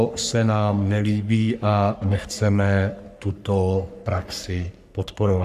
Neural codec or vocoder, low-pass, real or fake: codec, 44.1 kHz, 3.4 kbps, Pupu-Codec; 14.4 kHz; fake